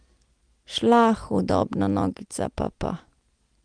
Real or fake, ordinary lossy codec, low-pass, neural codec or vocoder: real; Opus, 24 kbps; 9.9 kHz; none